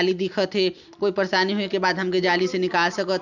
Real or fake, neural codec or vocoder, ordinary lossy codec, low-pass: real; none; none; 7.2 kHz